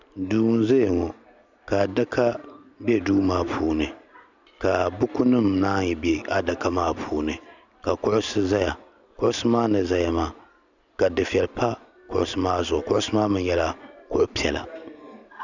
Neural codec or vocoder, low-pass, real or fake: none; 7.2 kHz; real